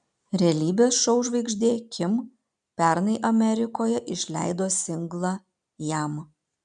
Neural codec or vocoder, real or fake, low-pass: none; real; 9.9 kHz